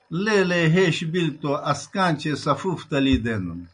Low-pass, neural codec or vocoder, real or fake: 9.9 kHz; none; real